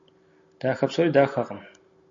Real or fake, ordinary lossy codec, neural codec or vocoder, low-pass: real; AAC, 64 kbps; none; 7.2 kHz